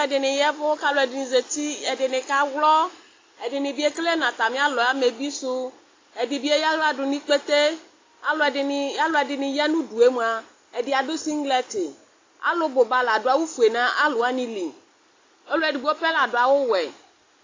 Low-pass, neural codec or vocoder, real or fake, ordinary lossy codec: 7.2 kHz; none; real; AAC, 32 kbps